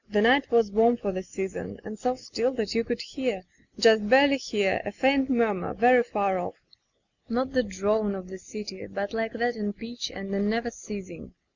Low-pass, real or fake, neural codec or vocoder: 7.2 kHz; real; none